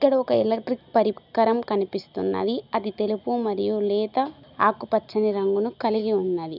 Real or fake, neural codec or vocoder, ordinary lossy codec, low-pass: real; none; none; 5.4 kHz